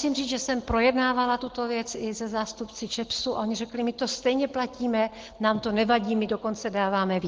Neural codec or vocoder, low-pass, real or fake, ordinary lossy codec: none; 7.2 kHz; real; Opus, 16 kbps